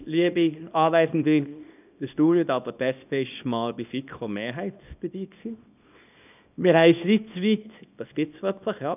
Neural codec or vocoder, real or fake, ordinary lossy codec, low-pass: codec, 24 kHz, 0.9 kbps, WavTokenizer, small release; fake; none; 3.6 kHz